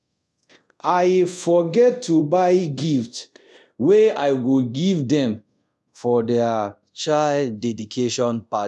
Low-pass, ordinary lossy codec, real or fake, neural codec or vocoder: none; none; fake; codec, 24 kHz, 0.5 kbps, DualCodec